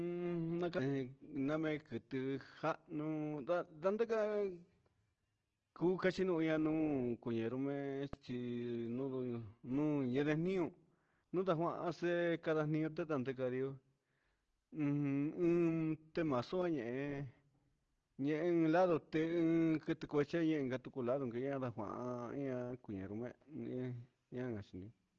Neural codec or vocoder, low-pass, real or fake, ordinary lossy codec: none; 7.2 kHz; real; Opus, 16 kbps